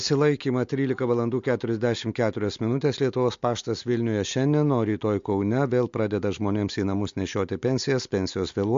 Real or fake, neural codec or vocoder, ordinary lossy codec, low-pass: real; none; MP3, 48 kbps; 7.2 kHz